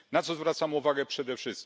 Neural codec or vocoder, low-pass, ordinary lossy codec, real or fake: none; none; none; real